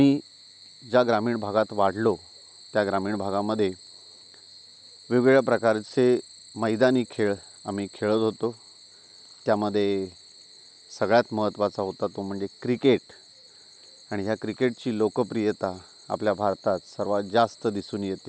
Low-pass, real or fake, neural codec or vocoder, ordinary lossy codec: none; real; none; none